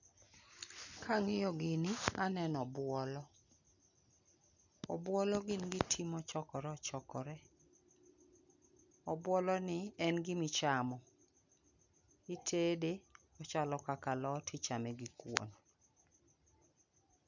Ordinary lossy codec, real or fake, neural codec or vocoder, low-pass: none; real; none; 7.2 kHz